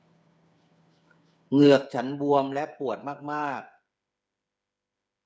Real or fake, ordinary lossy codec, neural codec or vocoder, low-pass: fake; none; codec, 16 kHz, 8 kbps, FreqCodec, smaller model; none